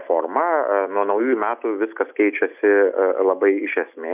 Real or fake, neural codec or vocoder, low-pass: real; none; 3.6 kHz